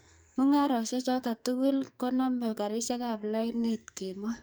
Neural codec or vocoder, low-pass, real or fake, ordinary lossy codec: codec, 44.1 kHz, 2.6 kbps, SNAC; none; fake; none